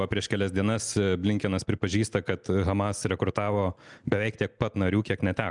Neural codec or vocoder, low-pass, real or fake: none; 10.8 kHz; real